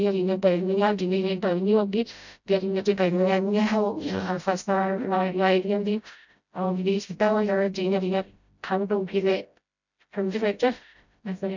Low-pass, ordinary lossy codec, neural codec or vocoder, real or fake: 7.2 kHz; none; codec, 16 kHz, 0.5 kbps, FreqCodec, smaller model; fake